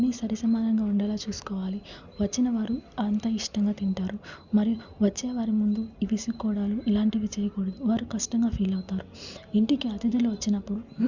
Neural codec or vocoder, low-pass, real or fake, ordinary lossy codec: none; 7.2 kHz; real; Opus, 64 kbps